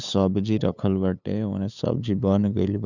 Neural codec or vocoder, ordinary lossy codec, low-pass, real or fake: codec, 16 kHz, 8 kbps, FunCodec, trained on LibriTTS, 25 frames a second; none; 7.2 kHz; fake